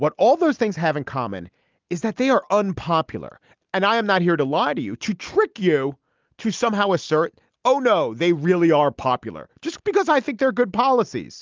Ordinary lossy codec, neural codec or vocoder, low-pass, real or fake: Opus, 32 kbps; none; 7.2 kHz; real